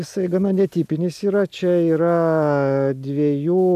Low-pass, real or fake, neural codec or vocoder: 14.4 kHz; real; none